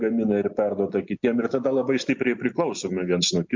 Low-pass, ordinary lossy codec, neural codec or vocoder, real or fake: 7.2 kHz; MP3, 48 kbps; none; real